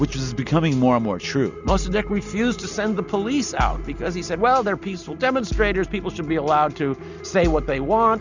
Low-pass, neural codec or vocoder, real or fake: 7.2 kHz; none; real